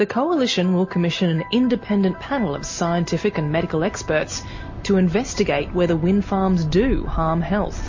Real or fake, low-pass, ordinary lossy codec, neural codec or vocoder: real; 7.2 kHz; MP3, 32 kbps; none